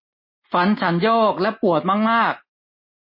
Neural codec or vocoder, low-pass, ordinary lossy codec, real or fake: none; 5.4 kHz; MP3, 24 kbps; real